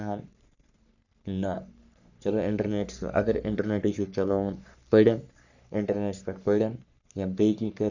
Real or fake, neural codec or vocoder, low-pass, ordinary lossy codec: fake; codec, 44.1 kHz, 3.4 kbps, Pupu-Codec; 7.2 kHz; none